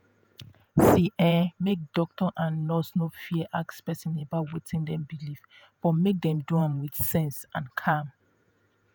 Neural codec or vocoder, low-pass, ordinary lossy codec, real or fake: vocoder, 48 kHz, 128 mel bands, Vocos; none; none; fake